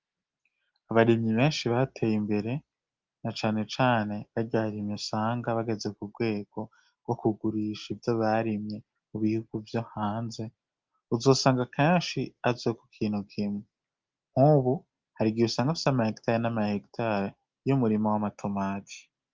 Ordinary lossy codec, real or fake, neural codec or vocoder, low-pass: Opus, 32 kbps; real; none; 7.2 kHz